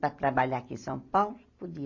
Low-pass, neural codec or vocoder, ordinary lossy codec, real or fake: 7.2 kHz; none; AAC, 48 kbps; real